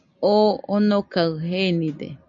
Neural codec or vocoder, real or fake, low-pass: none; real; 7.2 kHz